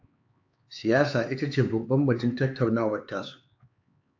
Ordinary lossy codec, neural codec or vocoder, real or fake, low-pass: AAC, 48 kbps; codec, 16 kHz, 4 kbps, X-Codec, HuBERT features, trained on LibriSpeech; fake; 7.2 kHz